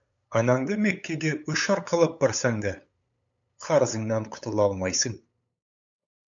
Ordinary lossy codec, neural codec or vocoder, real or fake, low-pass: MP3, 64 kbps; codec, 16 kHz, 8 kbps, FunCodec, trained on LibriTTS, 25 frames a second; fake; 7.2 kHz